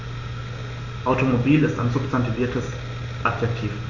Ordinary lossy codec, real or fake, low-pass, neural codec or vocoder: AAC, 48 kbps; real; 7.2 kHz; none